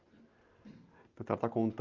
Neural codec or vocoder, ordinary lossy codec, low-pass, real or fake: none; Opus, 32 kbps; 7.2 kHz; real